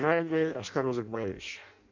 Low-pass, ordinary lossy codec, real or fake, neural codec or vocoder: 7.2 kHz; MP3, 64 kbps; fake; codec, 16 kHz in and 24 kHz out, 0.6 kbps, FireRedTTS-2 codec